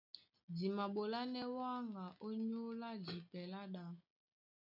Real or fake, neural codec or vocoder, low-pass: real; none; 5.4 kHz